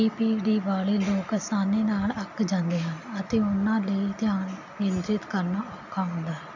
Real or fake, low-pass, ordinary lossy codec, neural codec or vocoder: real; 7.2 kHz; none; none